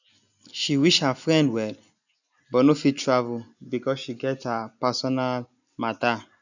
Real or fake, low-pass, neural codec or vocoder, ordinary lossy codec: real; 7.2 kHz; none; none